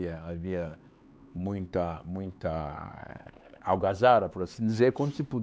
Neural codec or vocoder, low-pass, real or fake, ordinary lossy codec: codec, 16 kHz, 4 kbps, X-Codec, HuBERT features, trained on LibriSpeech; none; fake; none